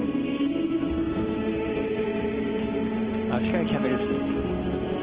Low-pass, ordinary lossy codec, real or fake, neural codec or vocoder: 3.6 kHz; Opus, 16 kbps; real; none